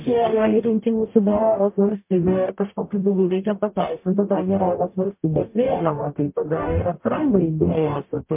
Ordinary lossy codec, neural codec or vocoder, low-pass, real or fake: MP3, 32 kbps; codec, 44.1 kHz, 0.9 kbps, DAC; 3.6 kHz; fake